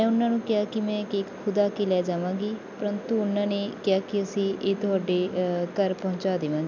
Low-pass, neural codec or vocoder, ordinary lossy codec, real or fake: 7.2 kHz; none; none; real